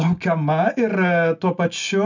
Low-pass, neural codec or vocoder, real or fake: 7.2 kHz; none; real